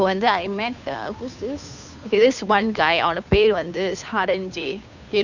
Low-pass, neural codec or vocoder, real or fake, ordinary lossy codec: 7.2 kHz; codec, 16 kHz, 2 kbps, FunCodec, trained on Chinese and English, 25 frames a second; fake; none